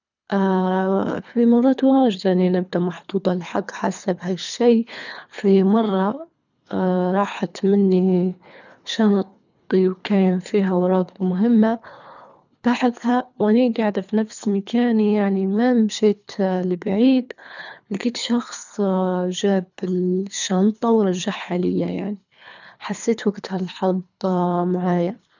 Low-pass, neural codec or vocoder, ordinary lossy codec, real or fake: 7.2 kHz; codec, 24 kHz, 3 kbps, HILCodec; none; fake